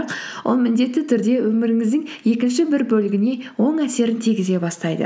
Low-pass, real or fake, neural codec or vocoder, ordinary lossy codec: none; real; none; none